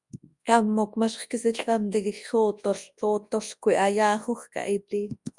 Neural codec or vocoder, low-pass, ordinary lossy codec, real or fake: codec, 24 kHz, 0.9 kbps, WavTokenizer, large speech release; 10.8 kHz; Opus, 64 kbps; fake